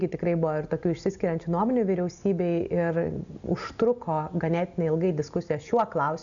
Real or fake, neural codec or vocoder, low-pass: real; none; 7.2 kHz